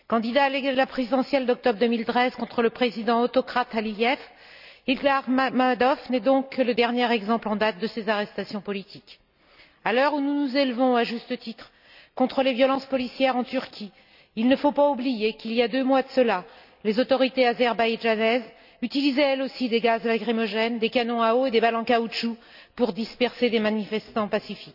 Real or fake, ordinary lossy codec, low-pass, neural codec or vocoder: real; none; 5.4 kHz; none